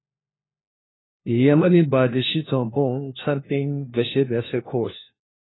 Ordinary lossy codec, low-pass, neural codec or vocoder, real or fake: AAC, 16 kbps; 7.2 kHz; codec, 16 kHz, 1 kbps, FunCodec, trained on LibriTTS, 50 frames a second; fake